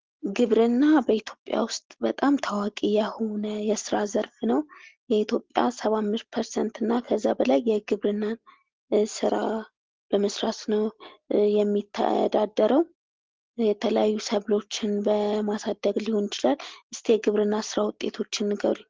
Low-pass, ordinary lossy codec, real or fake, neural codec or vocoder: 7.2 kHz; Opus, 16 kbps; real; none